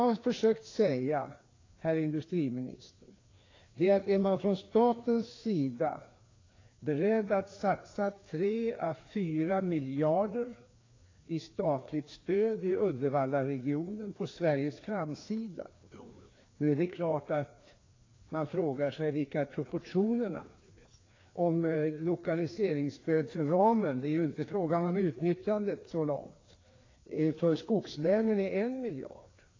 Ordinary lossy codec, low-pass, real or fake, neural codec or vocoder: AAC, 32 kbps; 7.2 kHz; fake; codec, 16 kHz, 2 kbps, FreqCodec, larger model